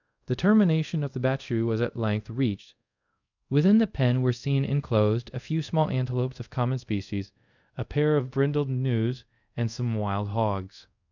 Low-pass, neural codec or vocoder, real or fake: 7.2 kHz; codec, 24 kHz, 0.5 kbps, DualCodec; fake